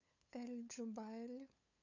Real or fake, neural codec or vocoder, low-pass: fake; codec, 16 kHz, 8 kbps, FunCodec, trained on LibriTTS, 25 frames a second; 7.2 kHz